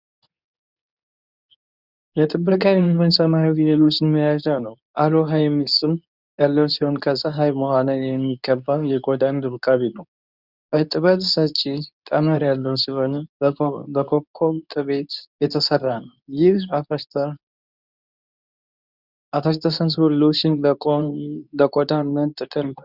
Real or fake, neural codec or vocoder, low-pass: fake; codec, 24 kHz, 0.9 kbps, WavTokenizer, medium speech release version 2; 5.4 kHz